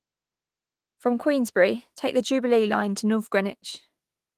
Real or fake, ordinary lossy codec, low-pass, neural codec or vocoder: fake; Opus, 24 kbps; 14.4 kHz; autoencoder, 48 kHz, 32 numbers a frame, DAC-VAE, trained on Japanese speech